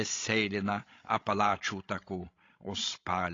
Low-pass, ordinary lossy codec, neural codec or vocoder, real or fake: 7.2 kHz; AAC, 32 kbps; codec, 16 kHz, 16 kbps, FreqCodec, larger model; fake